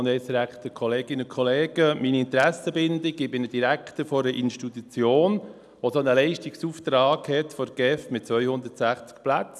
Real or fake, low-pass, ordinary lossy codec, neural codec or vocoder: real; none; none; none